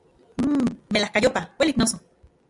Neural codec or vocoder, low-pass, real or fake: none; 10.8 kHz; real